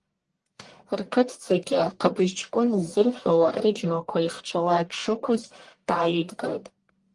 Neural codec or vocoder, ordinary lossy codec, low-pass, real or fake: codec, 44.1 kHz, 1.7 kbps, Pupu-Codec; Opus, 24 kbps; 10.8 kHz; fake